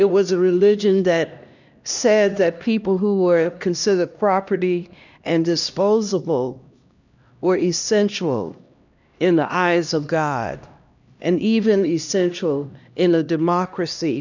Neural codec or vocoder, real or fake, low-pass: codec, 16 kHz, 1 kbps, X-Codec, HuBERT features, trained on LibriSpeech; fake; 7.2 kHz